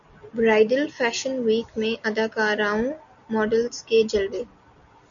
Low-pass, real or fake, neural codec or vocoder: 7.2 kHz; real; none